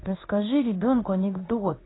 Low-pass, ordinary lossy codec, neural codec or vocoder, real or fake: 7.2 kHz; AAC, 16 kbps; codec, 16 kHz in and 24 kHz out, 1 kbps, XY-Tokenizer; fake